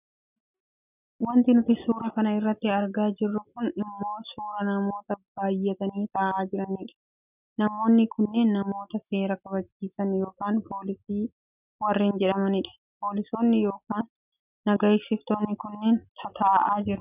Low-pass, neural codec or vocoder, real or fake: 3.6 kHz; none; real